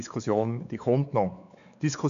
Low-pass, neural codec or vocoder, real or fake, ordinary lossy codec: 7.2 kHz; codec, 16 kHz, 4 kbps, X-Codec, WavLM features, trained on Multilingual LibriSpeech; fake; none